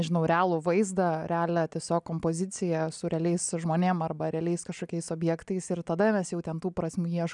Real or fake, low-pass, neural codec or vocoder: real; 10.8 kHz; none